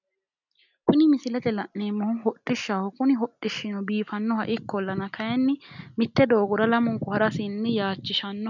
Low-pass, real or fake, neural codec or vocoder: 7.2 kHz; real; none